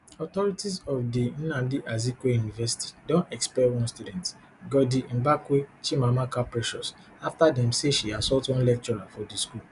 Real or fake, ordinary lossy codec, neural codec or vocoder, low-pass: real; none; none; 10.8 kHz